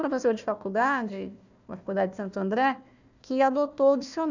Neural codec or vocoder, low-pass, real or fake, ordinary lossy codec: codec, 16 kHz, 1 kbps, FunCodec, trained on Chinese and English, 50 frames a second; 7.2 kHz; fake; none